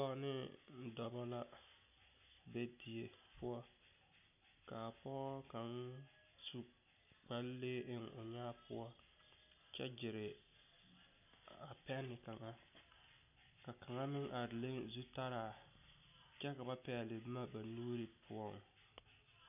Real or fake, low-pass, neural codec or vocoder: real; 3.6 kHz; none